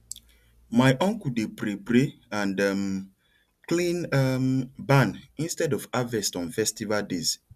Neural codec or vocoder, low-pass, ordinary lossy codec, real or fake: none; 14.4 kHz; none; real